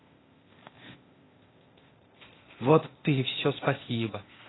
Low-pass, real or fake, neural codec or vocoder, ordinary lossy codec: 7.2 kHz; fake; codec, 16 kHz, 0.8 kbps, ZipCodec; AAC, 16 kbps